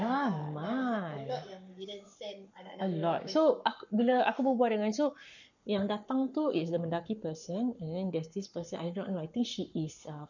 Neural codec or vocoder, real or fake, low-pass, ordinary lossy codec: codec, 44.1 kHz, 7.8 kbps, Pupu-Codec; fake; 7.2 kHz; none